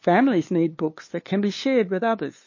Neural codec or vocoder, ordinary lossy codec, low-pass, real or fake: codec, 16 kHz, 2 kbps, FunCodec, trained on LibriTTS, 25 frames a second; MP3, 32 kbps; 7.2 kHz; fake